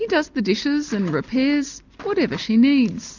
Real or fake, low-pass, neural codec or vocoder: real; 7.2 kHz; none